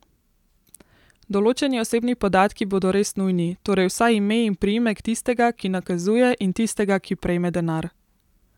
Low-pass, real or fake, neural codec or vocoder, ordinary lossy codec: 19.8 kHz; real; none; none